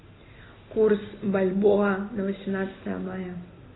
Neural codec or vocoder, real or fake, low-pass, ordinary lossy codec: none; real; 7.2 kHz; AAC, 16 kbps